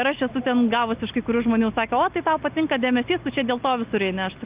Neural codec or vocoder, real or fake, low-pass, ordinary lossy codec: none; real; 3.6 kHz; Opus, 24 kbps